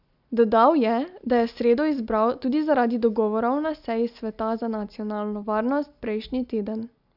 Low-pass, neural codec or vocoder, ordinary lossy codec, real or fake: 5.4 kHz; none; none; real